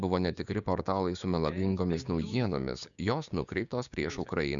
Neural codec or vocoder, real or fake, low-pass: codec, 16 kHz, 6 kbps, DAC; fake; 7.2 kHz